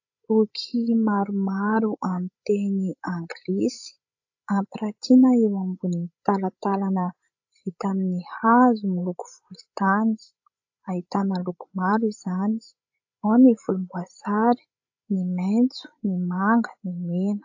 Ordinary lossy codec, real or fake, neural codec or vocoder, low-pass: AAC, 48 kbps; fake; codec, 16 kHz, 16 kbps, FreqCodec, larger model; 7.2 kHz